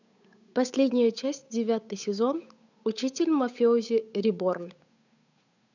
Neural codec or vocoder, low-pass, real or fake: codec, 16 kHz, 8 kbps, FunCodec, trained on Chinese and English, 25 frames a second; 7.2 kHz; fake